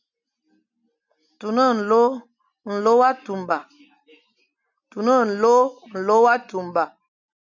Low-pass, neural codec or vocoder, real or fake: 7.2 kHz; none; real